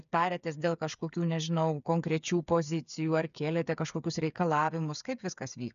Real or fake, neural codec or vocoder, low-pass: fake; codec, 16 kHz, 8 kbps, FreqCodec, smaller model; 7.2 kHz